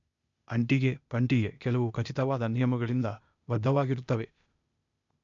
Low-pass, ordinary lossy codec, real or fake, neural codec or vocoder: 7.2 kHz; MP3, 64 kbps; fake; codec, 16 kHz, 0.8 kbps, ZipCodec